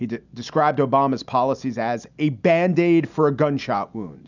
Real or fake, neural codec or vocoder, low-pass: real; none; 7.2 kHz